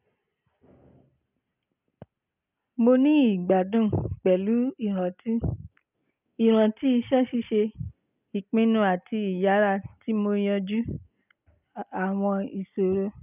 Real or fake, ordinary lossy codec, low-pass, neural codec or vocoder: real; none; 3.6 kHz; none